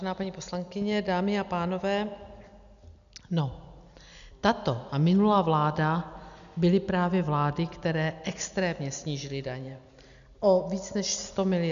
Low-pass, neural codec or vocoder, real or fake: 7.2 kHz; none; real